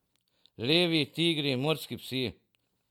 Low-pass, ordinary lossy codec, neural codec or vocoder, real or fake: 19.8 kHz; MP3, 96 kbps; none; real